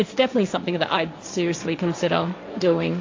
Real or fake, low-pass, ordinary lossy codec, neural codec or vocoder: fake; 7.2 kHz; AAC, 48 kbps; codec, 16 kHz, 1.1 kbps, Voila-Tokenizer